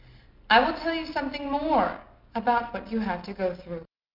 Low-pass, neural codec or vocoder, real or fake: 5.4 kHz; none; real